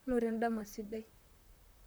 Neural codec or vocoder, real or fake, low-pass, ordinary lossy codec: vocoder, 44.1 kHz, 128 mel bands, Pupu-Vocoder; fake; none; none